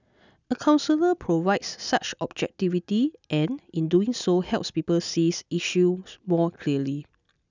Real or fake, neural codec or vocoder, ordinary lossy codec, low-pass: real; none; none; 7.2 kHz